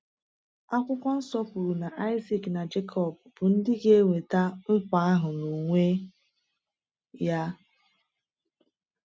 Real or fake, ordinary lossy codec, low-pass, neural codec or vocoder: real; none; none; none